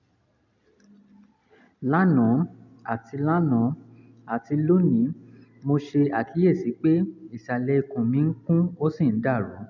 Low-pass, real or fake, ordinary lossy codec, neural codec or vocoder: 7.2 kHz; real; none; none